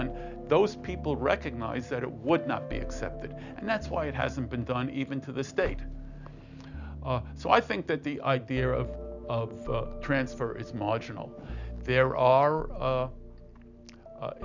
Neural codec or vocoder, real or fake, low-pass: none; real; 7.2 kHz